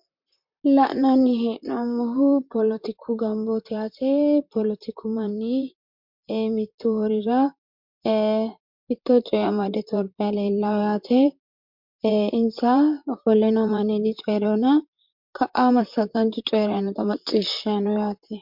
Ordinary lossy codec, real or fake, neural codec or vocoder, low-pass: MP3, 48 kbps; fake; vocoder, 44.1 kHz, 128 mel bands, Pupu-Vocoder; 5.4 kHz